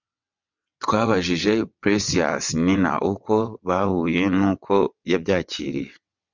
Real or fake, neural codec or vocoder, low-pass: fake; vocoder, 22.05 kHz, 80 mel bands, WaveNeXt; 7.2 kHz